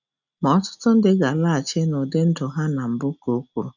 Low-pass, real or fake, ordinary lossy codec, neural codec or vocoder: 7.2 kHz; real; none; none